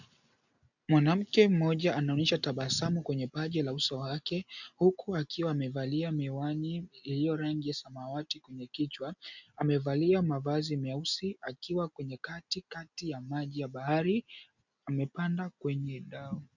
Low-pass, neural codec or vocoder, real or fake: 7.2 kHz; none; real